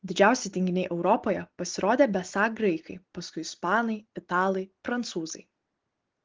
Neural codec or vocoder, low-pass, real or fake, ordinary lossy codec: none; 7.2 kHz; real; Opus, 16 kbps